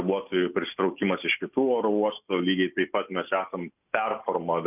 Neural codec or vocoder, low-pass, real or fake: none; 3.6 kHz; real